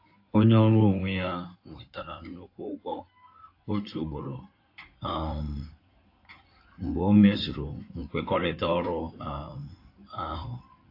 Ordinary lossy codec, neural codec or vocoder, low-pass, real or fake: AAC, 32 kbps; codec, 16 kHz in and 24 kHz out, 2.2 kbps, FireRedTTS-2 codec; 5.4 kHz; fake